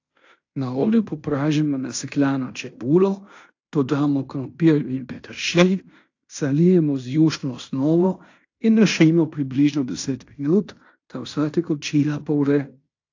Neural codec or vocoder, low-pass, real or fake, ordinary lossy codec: codec, 16 kHz in and 24 kHz out, 0.9 kbps, LongCat-Audio-Codec, fine tuned four codebook decoder; 7.2 kHz; fake; AAC, 48 kbps